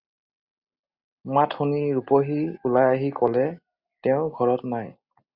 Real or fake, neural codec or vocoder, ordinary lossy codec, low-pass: real; none; Opus, 64 kbps; 5.4 kHz